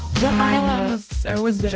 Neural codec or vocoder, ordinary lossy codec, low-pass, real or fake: codec, 16 kHz, 0.5 kbps, X-Codec, HuBERT features, trained on balanced general audio; none; none; fake